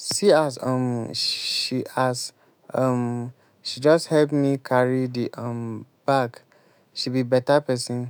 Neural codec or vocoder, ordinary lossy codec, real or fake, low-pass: autoencoder, 48 kHz, 128 numbers a frame, DAC-VAE, trained on Japanese speech; none; fake; none